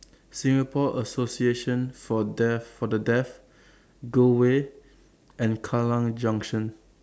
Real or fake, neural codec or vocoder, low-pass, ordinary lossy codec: real; none; none; none